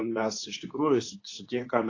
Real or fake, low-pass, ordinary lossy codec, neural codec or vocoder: fake; 7.2 kHz; AAC, 32 kbps; codec, 24 kHz, 0.9 kbps, WavTokenizer, medium speech release version 2